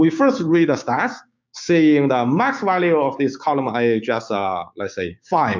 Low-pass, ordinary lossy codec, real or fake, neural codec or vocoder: 7.2 kHz; MP3, 64 kbps; fake; codec, 16 kHz, 6 kbps, DAC